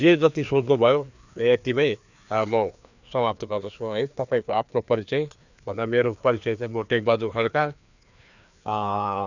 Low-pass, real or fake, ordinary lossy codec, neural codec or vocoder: 7.2 kHz; fake; none; codec, 16 kHz, 2 kbps, FreqCodec, larger model